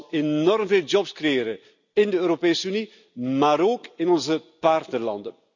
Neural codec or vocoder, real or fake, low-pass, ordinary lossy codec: none; real; 7.2 kHz; none